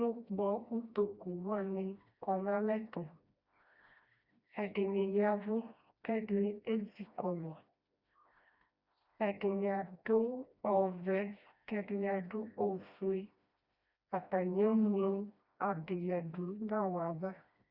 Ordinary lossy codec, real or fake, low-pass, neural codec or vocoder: Opus, 64 kbps; fake; 5.4 kHz; codec, 16 kHz, 1 kbps, FreqCodec, smaller model